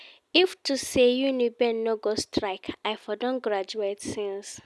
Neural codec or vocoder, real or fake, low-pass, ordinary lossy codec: none; real; none; none